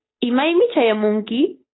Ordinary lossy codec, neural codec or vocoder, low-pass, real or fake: AAC, 16 kbps; codec, 16 kHz, 8 kbps, FunCodec, trained on Chinese and English, 25 frames a second; 7.2 kHz; fake